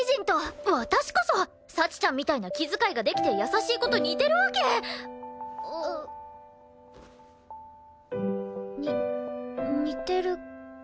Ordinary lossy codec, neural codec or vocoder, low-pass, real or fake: none; none; none; real